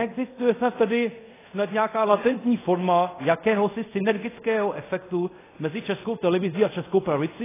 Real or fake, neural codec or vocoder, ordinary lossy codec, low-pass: fake; codec, 24 kHz, 0.5 kbps, DualCodec; AAC, 16 kbps; 3.6 kHz